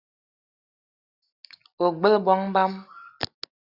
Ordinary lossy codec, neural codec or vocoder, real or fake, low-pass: Opus, 64 kbps; none; real; 5.4 kHz